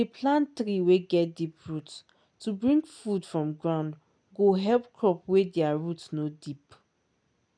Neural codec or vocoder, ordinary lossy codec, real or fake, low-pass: none; none; real; 9.9 kHz